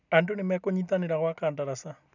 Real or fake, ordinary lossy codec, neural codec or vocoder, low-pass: real; none; none; 7.2 kHz